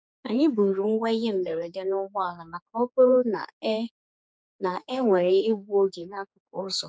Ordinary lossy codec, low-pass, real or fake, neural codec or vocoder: none; none; fake; codec, 16 kHz, 4 kbps, X-Codec, HuBERT features, trained on general audio